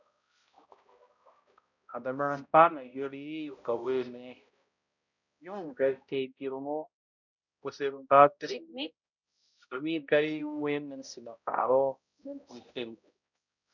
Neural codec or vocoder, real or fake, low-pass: codec, 16 kHz, 0.5 kbps, X-Codec, HuBERT features, trained on balanced general audio; fake; 7.2 kHz